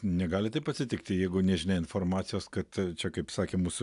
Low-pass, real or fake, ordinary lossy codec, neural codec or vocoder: 10.8 kHz; real; AAC, 96 kbps; none